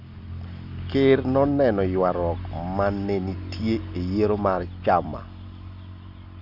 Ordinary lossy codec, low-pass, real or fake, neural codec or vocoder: none; 5.4 kHz; real; none